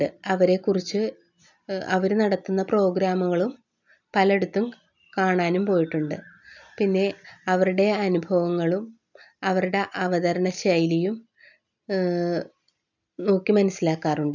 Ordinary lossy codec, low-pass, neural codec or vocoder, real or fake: none; 7.2 kHz; none; real